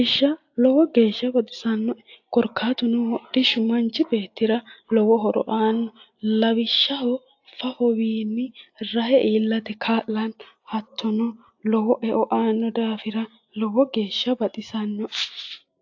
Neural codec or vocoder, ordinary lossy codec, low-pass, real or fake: none; AAC, 48 kbps; 7.2 kHz; real